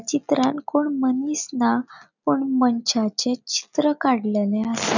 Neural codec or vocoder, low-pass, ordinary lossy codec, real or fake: none; 7.2 kHz; none; real